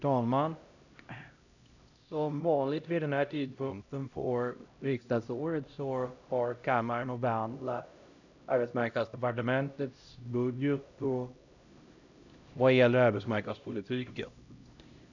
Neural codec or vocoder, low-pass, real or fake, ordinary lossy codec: codec, 16 kHz, 0.5 kbps, X-Codec, HuBERT features, trained on LibriSpeech; 7.2 kHz; fake; none